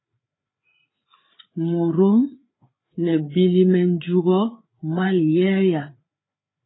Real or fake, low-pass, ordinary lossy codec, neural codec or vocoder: fake; 7.2 kHz; AAC, 16 kbps; codec, 16 kHz, 4 kbps, FreqCodec, larger model